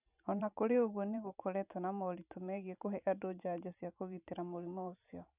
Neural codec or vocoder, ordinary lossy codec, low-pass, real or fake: vocoder, 44.1 kHz, 128 mel bands every 512 samples, BigVGAN v2; none; 3.6 kHz; fake